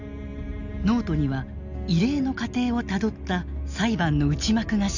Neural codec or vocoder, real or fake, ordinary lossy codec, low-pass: none; real; none; 7.2 kHz